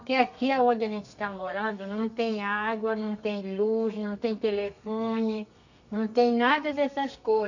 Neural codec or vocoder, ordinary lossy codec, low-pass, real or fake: codec, 32 kHz, 1.9 kbps, SNAC; none; 7.2 kHz; fake